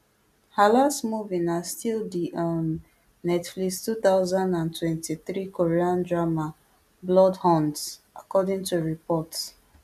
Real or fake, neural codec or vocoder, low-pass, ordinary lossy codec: real; none; 14.4 kHz; none